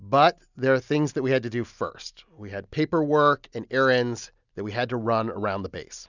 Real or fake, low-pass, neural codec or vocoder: real; 7.2 kHz; none